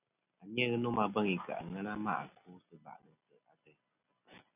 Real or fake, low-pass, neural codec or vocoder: real; 3.6 kHz; none